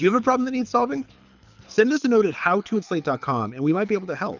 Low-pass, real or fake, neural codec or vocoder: 7.2 kHz; fake; codec, 24 kHz, 6 kbps, HILCodec